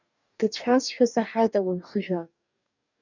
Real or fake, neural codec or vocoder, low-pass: fake; codec, 44.1 kHz, 2.6 kbps, DAC; 7.2 kHz